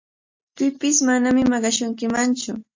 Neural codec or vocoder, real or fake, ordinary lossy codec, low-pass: none; real; MP3, 48 kbps; 7.2 kHz